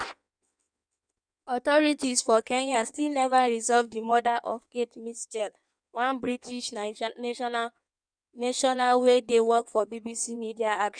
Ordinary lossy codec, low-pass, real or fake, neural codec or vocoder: none; 9.9 kHz; fake; codec, 16 kHz in and 24 kHz out, 1.1 kbps, FireRedTTS-2 codec